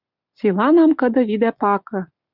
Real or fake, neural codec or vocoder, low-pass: real; none; 5.4 kHz